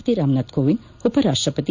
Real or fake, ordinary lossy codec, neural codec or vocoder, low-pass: real; none; none; 7.2 kHz